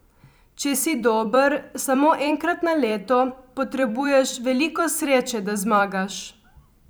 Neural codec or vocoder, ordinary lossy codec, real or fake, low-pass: vocoder, 44.1 kHz, 128 mel bands every 256 samples, BigVGAN v2; none; fake; none